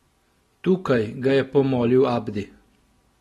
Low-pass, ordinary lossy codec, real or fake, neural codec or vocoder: 19.8 kHz; AAC, 32 kbps; real; none